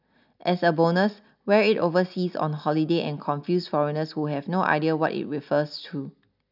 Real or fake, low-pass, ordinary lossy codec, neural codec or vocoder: real; 5.4 kHz; none; none